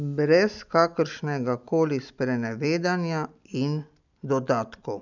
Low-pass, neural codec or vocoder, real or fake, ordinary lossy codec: 7.2 kHz; none; real; none